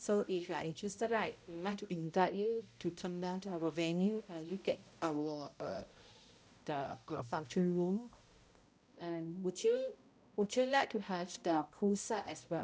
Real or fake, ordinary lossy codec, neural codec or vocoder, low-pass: fake; none; codec, 16 kHz, 0.5 kbps, X-Codec, HuBERT features, trained on balanced general audio; none